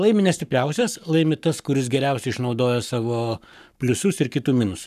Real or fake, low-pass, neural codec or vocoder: fake; 14.4 kHz; codec, 44.1 kHz, 7.8 kbps, Pupu-Codec